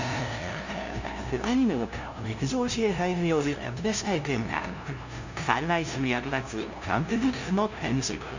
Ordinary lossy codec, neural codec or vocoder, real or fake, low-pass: Opus, 64 kbps; codec, 16 kHz, 0.5 kbps, FunCodec, trained on LibriTTS, 25 frames a second; fake; 7.2 kHz